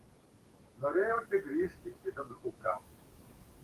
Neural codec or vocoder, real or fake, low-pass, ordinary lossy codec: codec, 44.1 kHz, 7.8 kbps, DAC; fake; 14.4 kHz; Opus, 24 kbps